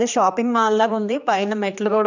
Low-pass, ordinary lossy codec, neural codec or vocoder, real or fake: 7.2 kHz; none; codec, 16 kHz, 2 kbps, X-Codec, HuBERT features, trained on general audio; fake